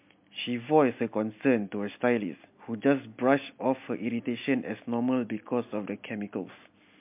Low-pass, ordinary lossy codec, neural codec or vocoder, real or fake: 3.6 kHz; MP3, 32 kbps; none; real